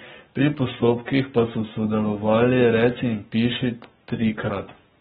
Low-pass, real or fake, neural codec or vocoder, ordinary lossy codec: 19.8 kHz; fake; codec, 44.1 kHz, 7.8 kbps, Pupu-Codec; AAC, 16 kbps